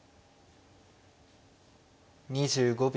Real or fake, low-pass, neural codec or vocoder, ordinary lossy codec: real; none; none; none